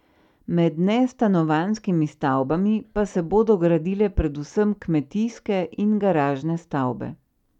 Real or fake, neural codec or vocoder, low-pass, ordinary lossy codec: real; none; 19.8 kHz; none